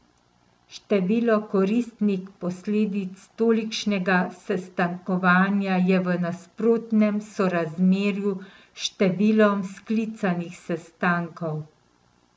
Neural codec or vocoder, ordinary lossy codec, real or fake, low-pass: none; none; real; none